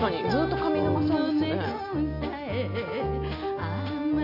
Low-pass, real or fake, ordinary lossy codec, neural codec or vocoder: 5.4 kHz; real; none; none